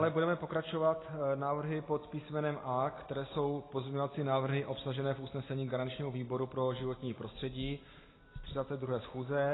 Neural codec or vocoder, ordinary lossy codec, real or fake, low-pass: none; AAC, 16 kbps; real; 7.2 kHz